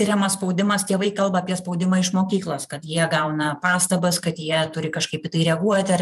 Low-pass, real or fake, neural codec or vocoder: 14.4 kHz; fake; vocoder, 44.1 kHz, 128 mel bands every 512 samples, BigVGAN v2